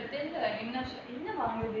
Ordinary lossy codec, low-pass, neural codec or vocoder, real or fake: none; 7.2 kHz; none; real